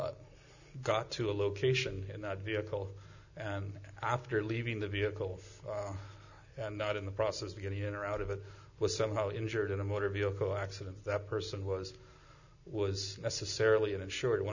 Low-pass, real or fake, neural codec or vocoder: 7.2 kHz; real; none